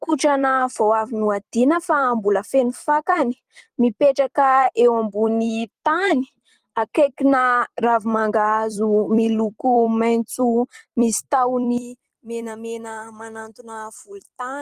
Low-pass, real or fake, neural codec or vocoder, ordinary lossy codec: 14.4 kHz; fake; vocoder, 44.1 kHz, 128 mel bands every 256 samples, BigVGAN v2; Opus, 24 kbps